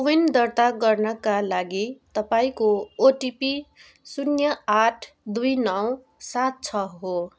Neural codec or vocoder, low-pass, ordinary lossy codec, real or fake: none; none; none; real